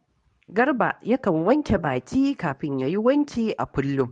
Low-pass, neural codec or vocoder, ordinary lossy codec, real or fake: 10.8 kHz; codec, 24 kHz, 0.9 kbps, WavTokenizer, medium speech release version 2; MP3, 64 kbps; fake